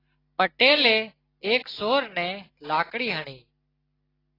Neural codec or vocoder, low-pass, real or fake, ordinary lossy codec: none; 5.4 kHz; real; AAC, 24 kbps